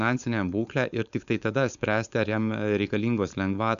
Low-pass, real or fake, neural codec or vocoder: 7.2 kHz; fake; codec, 16 kHz, 4.8 kbps, FACodec